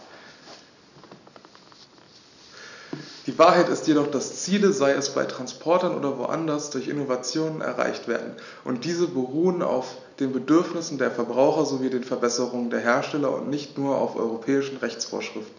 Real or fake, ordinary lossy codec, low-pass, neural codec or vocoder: real; none; 7.2 kHz; none